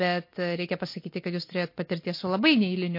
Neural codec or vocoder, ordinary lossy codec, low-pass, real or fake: none; MP3, 32 kbps; 5.4 kHz; real